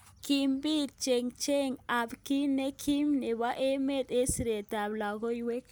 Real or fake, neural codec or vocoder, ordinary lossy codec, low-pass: real; none; none; none